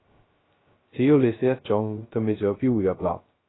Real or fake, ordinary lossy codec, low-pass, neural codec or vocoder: fake; AAC, 16 kbps; 7.2 kHz; codec, 16 kHz, 0.2 kbps, FocalCodec